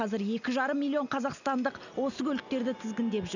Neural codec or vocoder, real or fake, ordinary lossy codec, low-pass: none; real; none; 7.2 kHz